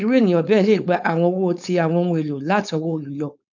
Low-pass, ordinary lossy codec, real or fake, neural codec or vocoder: 7.2 kHz; none; fake; codec, 16 kHz, 4.8 kbps, FACodec